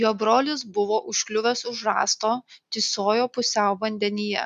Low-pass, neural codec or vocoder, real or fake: 14.4 kHz; none; real